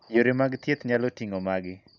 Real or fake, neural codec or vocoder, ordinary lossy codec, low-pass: real; none; none; 7.2 kHz